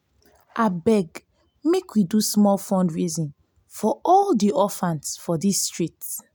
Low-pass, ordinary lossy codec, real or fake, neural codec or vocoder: none; none; real; none